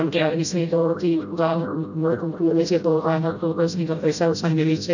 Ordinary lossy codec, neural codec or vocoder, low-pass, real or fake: none; codec, 16 kHz, 0.5 kbps, FreqCodec, smaller model; 7.2 kHz; fake